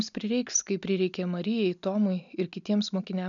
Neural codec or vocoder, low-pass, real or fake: none; 7.2 kHz; real